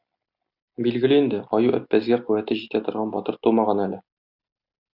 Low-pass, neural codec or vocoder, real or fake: 5.4 kHz; none; real